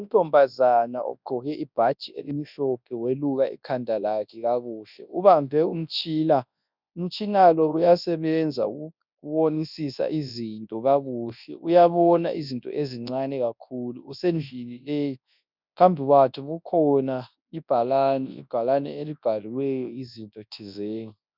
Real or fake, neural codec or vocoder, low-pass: fake; codec, 24 kHz, 0.9 kbps, WavTokenizer, large speech release; 5.4 kHz